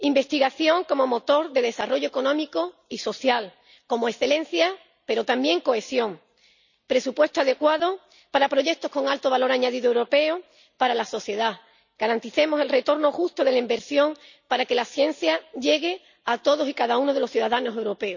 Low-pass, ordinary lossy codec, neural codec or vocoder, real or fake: 7.2 kHz; none; none; real